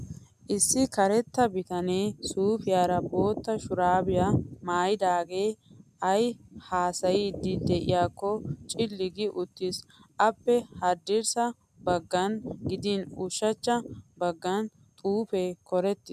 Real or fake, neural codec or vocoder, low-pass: real; none; 14.4 kHz